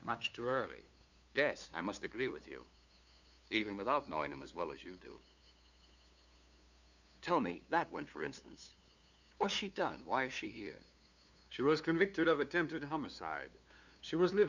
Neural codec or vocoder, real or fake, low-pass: codec, 16 kHz, 2 kbps, FunCodec, trained on LibriTTS, 25 frames a second; fake; 7.2 kHz